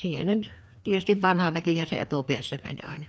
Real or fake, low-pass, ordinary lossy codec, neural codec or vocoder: fake; none; none; codec, 16 kHz, 2 kbps, FreqCodec, larger model